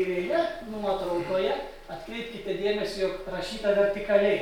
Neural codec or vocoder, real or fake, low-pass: none; real; 19.8 kHz